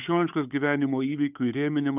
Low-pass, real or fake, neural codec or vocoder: 3.6 kHz; fake; codec, 16 kHz, 8 kbps, FunCodec, trained on Chinese and English, 25 frames a second